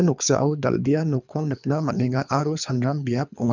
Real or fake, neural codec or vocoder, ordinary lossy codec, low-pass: fake; codec, 24 kHz, 3 kbps, HILCodec; none; 7.2 kHz